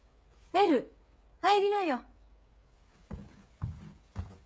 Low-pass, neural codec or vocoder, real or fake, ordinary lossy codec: none; codec, 16 kHz, 8 kbps, FreqCodec, smaller model; fake; none